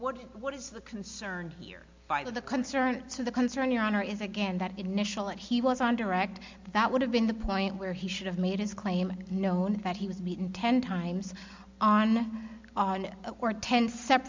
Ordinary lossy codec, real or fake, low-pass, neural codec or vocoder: MP3, 64 kbps; real; 7.2 kHz; none